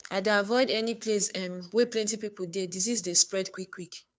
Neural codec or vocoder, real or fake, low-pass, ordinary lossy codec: codec, 16 kHz, 2 kbps, FunCodec, trained on Chinese and English, 25 frames a second; fake; none; none